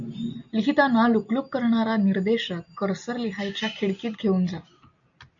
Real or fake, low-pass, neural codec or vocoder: real; 7.2 kHz; none